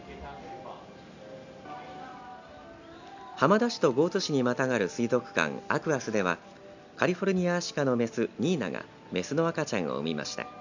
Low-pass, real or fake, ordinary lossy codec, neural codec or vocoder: 7.2 kHz; real; none; none